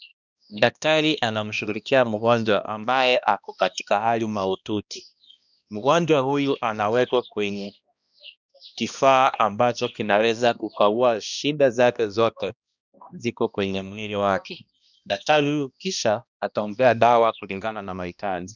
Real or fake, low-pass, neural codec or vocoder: fake; 7.2 kHz; codec, 16 kHz, 1 kbps, X-Codec, HuBERT features, trained on balanced general audio